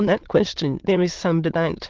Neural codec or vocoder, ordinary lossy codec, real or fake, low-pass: autoencoder, 22.05 kHz, a latent of 192 numbers a frame, VITS, trained on many speakers; Opus, 16 kbps; fake; 7.2 kHz